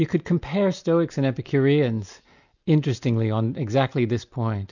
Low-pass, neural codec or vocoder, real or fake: 7.2 kHz; none; real